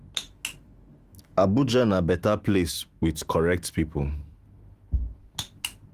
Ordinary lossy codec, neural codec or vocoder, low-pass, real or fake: Opus, 24 kbps; autoencoder, 48 kHz, 128 numbers a frame, DAC-VAE, trained on Japanese speech; 14.4 kHz; fake